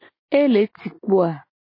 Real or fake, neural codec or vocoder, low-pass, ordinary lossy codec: real; none; 5.4 kHz; MP3, 24 kbps